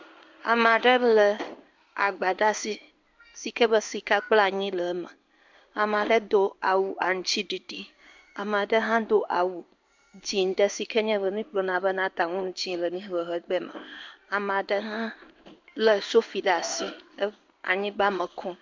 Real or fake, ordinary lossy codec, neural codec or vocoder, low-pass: fake; MP3, 64 kbps; codec, 16 kHz in and 24 kHz out, 1 kbps, XY-Tokenizer; 7.2 kHz